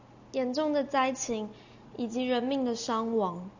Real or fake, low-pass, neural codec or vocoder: real; 7.2 kHz; none